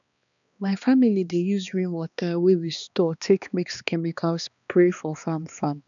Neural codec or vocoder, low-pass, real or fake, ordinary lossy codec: codec, 16 kHz, 2 kbps, X-Codec, HuBERT features, trained on balanced general audio; 7.2 kHz; fake; none